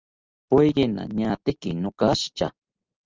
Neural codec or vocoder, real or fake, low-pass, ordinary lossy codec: none; real; 7.2 kHz; Opus, 24 kbps